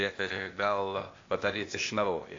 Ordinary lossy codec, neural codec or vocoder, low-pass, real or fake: AAC, 48 kbps; codec, 16 kHz, 0.8 kbps, ZipCodec; 7.2 kHz; fake